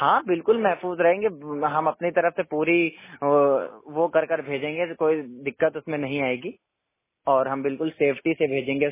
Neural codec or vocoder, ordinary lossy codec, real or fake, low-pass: none; MP3, 16 kbps; real; 3.6 kHz